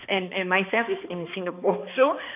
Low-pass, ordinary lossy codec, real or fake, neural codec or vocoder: 3.6 kHz; none; fake; codec, 16 kHz, 2 kbps, X-Codec, HuBERT features, trained on balanced general audio